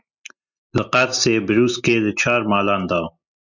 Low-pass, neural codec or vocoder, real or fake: 7.2 kHz; none; real